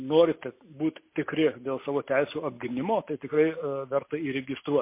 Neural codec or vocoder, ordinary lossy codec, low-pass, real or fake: none; MP3, 24 kbps; 3.6 kHz; real